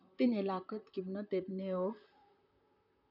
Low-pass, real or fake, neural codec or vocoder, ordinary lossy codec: 5.4 kHz; fake; vocoder, 44.1 kHz, 128 mel bands, Pupu-Vocoder; none